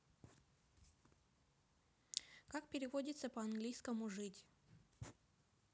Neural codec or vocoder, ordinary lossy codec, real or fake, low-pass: none; none; real; none